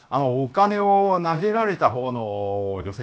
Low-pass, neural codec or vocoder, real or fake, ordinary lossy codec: none; codec, 16 kHz, 0.7 kbps, FocalCodec; fake; none